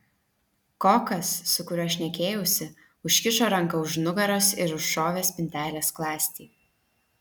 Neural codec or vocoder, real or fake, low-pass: none; real; 19.8 kHz